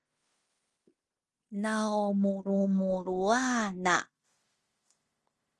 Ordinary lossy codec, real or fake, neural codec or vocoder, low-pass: Opus, 24 kbps; fake; codec, 16 kHz in and 24 kHz out, 0.9 kbps, LongCat-Audio-Codec, fine tuned four codebook decoder; 10.8 kHz